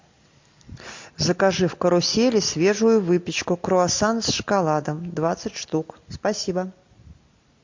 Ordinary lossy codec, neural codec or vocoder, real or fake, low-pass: MP3, 48 kbps; none; real; 7.2 kHz